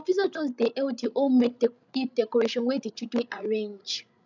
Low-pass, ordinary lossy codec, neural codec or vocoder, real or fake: 7.2 kHz; none; codec, 16 kHz, 16 kbps, FreqCodec, larger model; fake